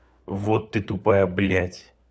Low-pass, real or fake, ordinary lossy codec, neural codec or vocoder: none; fake; none; codec, 16 kHz, 4 kbps, FunCodec, trained on LibriTTS, 50 frames a second